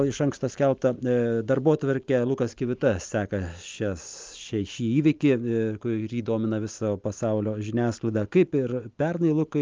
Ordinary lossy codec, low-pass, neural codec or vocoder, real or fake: Opus, 24 kbps; 7.2 kHz; none; real